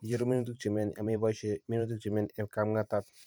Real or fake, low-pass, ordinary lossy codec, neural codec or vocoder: fake; none; none; vocoder, 44.1 kHz, 128 mel bands every 512 samples, BigVGAN v2